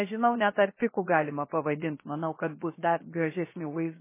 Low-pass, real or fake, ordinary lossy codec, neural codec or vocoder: 3.6 kHz; fake; MP3, 16 kbps; codec, 16 kHz, 0.7 kbps, FocalCodec